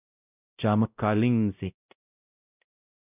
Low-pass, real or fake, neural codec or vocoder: 3.6 kHz; fake; codec, 16 kHz, 0.5 kbps, X-Codec, WavLM features, trained on Multilingual LibriSpeech